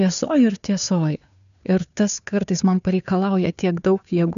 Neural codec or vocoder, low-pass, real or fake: codec, 16 kHz, 2 kbps, FunCodec, trained on Chinese and English, 25 frames a second; 7.2 kHz; fake